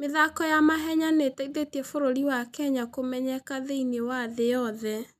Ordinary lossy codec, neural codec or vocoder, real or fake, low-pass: none; none; real; 14.4 kHz